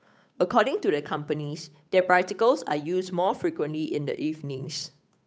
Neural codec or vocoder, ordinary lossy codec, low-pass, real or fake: codec, 16 kHz, 8 kbps, FunCodec, trained on Chinese and English, 25 frames a second; none; none; fake